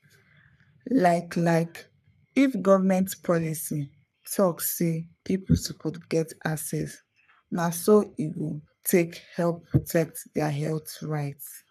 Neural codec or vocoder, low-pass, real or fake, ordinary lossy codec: codec, 44.1 kHz, 3.4 kbps, Pupu-Codec; 14.4 kHz; fake; none